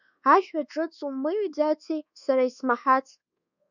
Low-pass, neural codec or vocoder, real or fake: 7.2 kHz; codec, 24 kHz, 1.2 kbps, DualCodec; fake